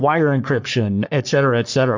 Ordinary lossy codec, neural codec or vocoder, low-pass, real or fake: MP3, 64 kbps; codec, 44.1 kHz, 3.4 kbps, Pupu-Codec; 7.2 kHz; fake